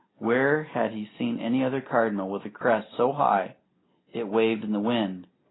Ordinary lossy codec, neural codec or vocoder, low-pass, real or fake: AAC, 16 kbps; none; 7.2 kHz; real